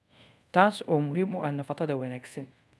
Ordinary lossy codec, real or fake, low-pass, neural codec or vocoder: none; fake; none; codec, 24 kHz, 0.5 kbps, DualCodec